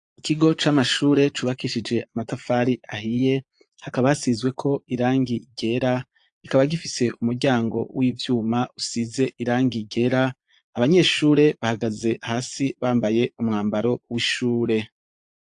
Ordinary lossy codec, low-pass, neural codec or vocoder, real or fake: AAC, 64 kbps; 9.9 kHz; vocoder, 22.05 kHz, 80 mel bands, Vocos; fake